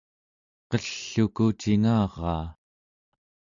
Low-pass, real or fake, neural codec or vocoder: 7.2 kHz; real; none